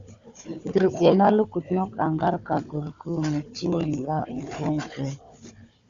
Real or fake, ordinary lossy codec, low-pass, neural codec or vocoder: fake; Opus, 64 kbps; 7.2 kHz; codec, 16 kHz, 4 kbps, FunCodec, trained on Chinese and English, 50 frames a second